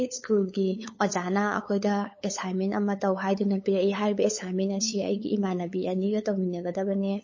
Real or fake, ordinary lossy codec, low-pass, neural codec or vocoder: fake; MP3, 32 kbps; 7.2 kHz; codec, 16 kHz, 8 kbps, FunCodec, trained on LibriTTS, 25 frames a second